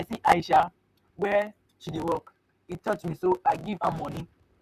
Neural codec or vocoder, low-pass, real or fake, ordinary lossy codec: vocoder, 44.1 kHz, 128 mel bands, Pupu-Vocoder; 14.4 kHz; fake; none